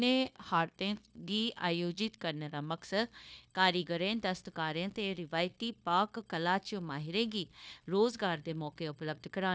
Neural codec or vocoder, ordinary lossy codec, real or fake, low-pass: codec, 16 kHz, 0.9 kbps, LongCat-Audio-Codec; none; fake; none